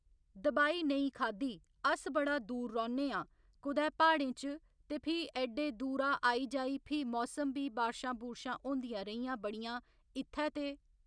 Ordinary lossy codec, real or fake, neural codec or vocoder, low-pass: none; real; none; 9.9 kHz